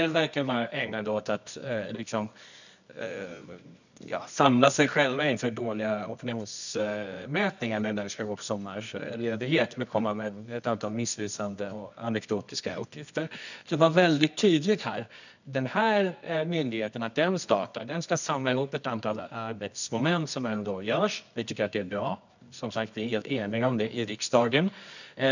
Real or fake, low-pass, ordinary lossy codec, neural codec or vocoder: fake; 7.2 kHz; none; codec, 24 kHz, 0.9 kbps, WavTokenizer, medium music audio release